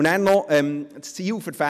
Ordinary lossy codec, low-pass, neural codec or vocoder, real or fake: none; 14.4 kHz; none; real